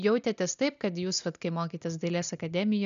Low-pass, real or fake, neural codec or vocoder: 7.2 kHz; real; none